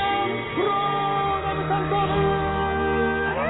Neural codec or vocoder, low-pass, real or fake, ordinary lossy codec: none; 7.2 kHz; real; AAC, 16 kbps